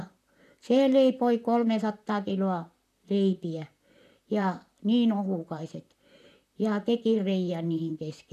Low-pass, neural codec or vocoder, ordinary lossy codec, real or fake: 14.4 kHz; vocoder, 44.1 kHz, 128 mel bands, Pupu-Vocoder; none; fake